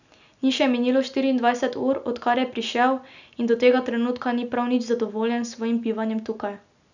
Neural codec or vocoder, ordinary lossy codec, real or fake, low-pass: none; none; real; 7.2 kHz